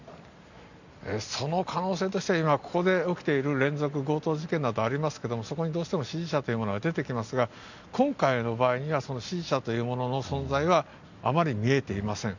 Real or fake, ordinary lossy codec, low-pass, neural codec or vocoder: real; none; 7.2 kHz; none